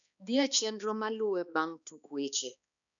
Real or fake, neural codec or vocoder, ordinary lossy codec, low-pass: fake; codec, 16 kHz, 2 kbps, X-Codec, HuBERT features, trained on balanced general audio; none; 7.2 kHz